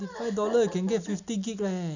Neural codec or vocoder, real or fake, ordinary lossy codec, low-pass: none; real; none; 7.2 kHz